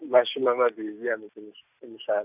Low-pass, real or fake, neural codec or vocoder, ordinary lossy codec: 3.6 kHz; real; none; none